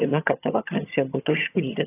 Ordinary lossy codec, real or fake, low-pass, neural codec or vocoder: AAC, 24 kbps; fake; 3.6 kHz; vocoder, 22.05 kHz, 80 mel bands, HiFi-GAN